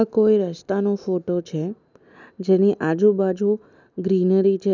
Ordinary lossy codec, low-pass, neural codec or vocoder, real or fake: none; 7.2 kHz; none; real